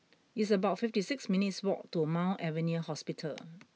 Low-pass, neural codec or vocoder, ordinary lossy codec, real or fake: none; none; none; real